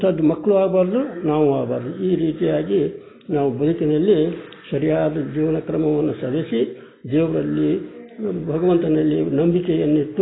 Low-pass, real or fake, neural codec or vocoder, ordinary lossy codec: 7.2 kHz; real; none; AAC, 16 kbps